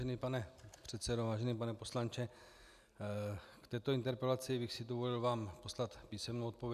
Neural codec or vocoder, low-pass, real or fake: none; 14.4 kHz; real